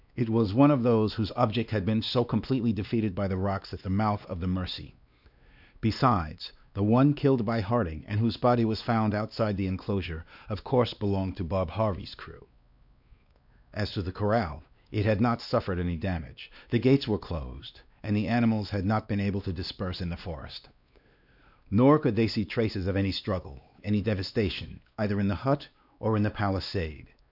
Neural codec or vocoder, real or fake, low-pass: codec, 16 kHz, 2 kbps, X-Codec, WavLM features, trained on Multilingual LibriSpeech; fake; 5.4 kHz